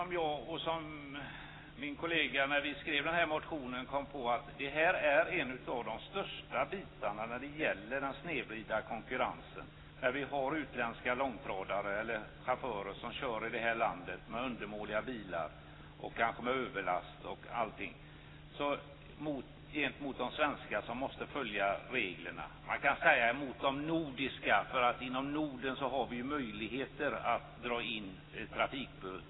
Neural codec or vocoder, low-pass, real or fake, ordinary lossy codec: none; 7.2 kHz; real; AAC, 16 kbps